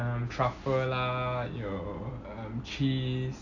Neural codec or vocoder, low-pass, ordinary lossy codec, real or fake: none; 7.2 kHz; none; real